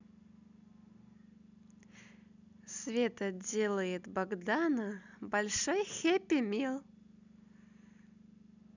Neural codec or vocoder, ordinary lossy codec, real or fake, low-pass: none; none; real; 7.2 kHz